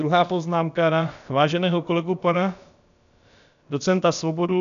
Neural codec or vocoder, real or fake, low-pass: codec, 16 kHz, about 1 kbps, DyCAST, with the encoder's durations; fake; 7.2 kHz